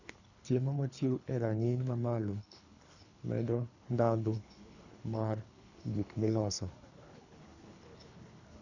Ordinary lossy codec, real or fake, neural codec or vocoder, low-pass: none; fake; codec, 24 kHz, 3 kbps, HILCodec; 7.2 kHz